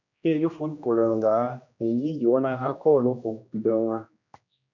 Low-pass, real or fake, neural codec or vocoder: 7.2 kHz; fake; codec, 16 kHz, 1 kbps, X-Codec, HuBERT features, trained on general audio